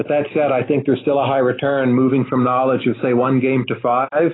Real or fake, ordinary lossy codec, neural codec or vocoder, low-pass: real; AAC, 16 kbps; none; 7.2 kHz